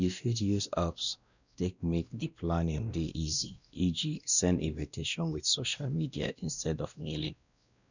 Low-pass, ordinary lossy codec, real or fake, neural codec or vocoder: 7.2 kHz; none; fake; codec, 16 kHz, 1 kbps, X-Codec, WavLM features, trained on Multilingual LibriSpeech